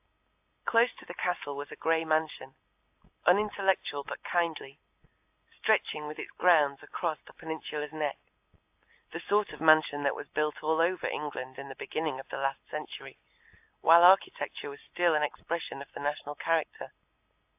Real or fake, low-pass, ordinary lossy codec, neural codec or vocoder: real; 3.6 kHz; AAC, 32 kbps; none